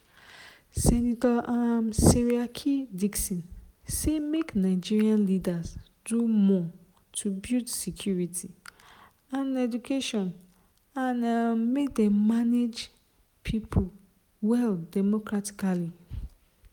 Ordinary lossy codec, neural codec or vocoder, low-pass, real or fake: none; none; 19.8 kHz; real